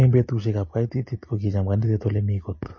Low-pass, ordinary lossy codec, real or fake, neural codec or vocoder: 7.2 kHz; MP3, 32 kbps; real; none